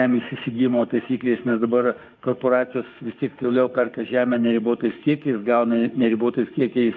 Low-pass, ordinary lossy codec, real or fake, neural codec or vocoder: 7.2 kHz; MP3, 64 kbps; fake; autoencoder, 48 kHz, 32 numbers a frame, DAC-VAE, trained on Japanese speech